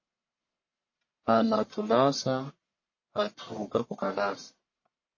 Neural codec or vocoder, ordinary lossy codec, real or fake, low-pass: codec, 44.1 kHz, 1.7 kbps, Pupu-Codec; MP3, 32 kbps; fake; 7.2 kHz